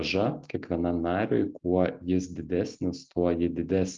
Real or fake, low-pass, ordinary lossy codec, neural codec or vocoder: real; 7.2 kHz; Opus, 32 kbps; none